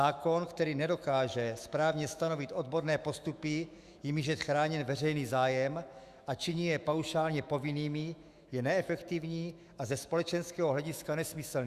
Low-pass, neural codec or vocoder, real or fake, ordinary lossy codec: 14.4 kHz; autoencoder, 48 kHz, 128 numbers a frame, DAC-VAE, trained on Japanese speech; fake; Opus, 64 kbps